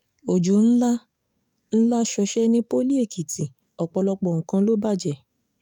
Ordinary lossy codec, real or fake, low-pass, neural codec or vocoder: none; fake; 19.8 kHz; codec, 44.1 kHz, 7.8 kbps, DAC